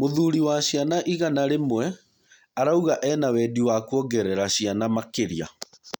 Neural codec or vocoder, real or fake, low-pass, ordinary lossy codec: none; real; 19.8 kHz; none